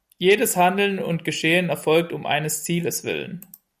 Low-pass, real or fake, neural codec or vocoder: 14.4 kHz; real; none